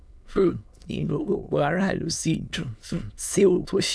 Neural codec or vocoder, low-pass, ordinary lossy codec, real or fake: autoencoder, 22.05 kHz, a latent of 192 numbers a frame, VITS, trained on many speakers; none; none; fake